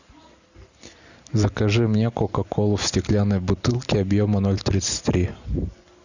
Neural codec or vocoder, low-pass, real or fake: none; 7.2 kHz; real